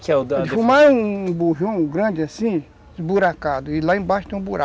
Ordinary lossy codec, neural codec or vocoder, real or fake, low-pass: none; none; real; none